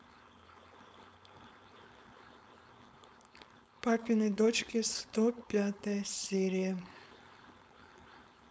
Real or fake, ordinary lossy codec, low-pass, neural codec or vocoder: fake; none; none; codec, 16 kHz, 4.8 kbps, FACodec